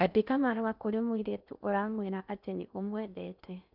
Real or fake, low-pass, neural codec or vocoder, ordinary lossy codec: fake; 5.4 kHz; codec, 16 kHz in and 24 kHz out, 0.6 kbps, FocalCodec, streaming, 4096 codes; none